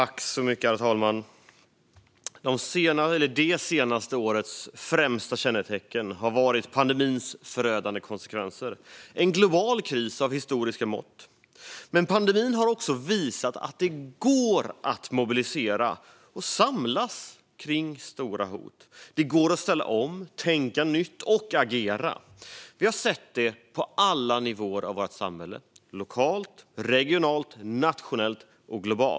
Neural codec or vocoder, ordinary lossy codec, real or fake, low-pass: none; none; real; none